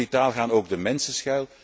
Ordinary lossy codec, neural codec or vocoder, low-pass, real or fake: none; none; none; real